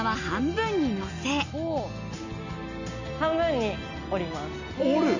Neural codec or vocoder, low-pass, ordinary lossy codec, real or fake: none; 7.2 kHz; none; real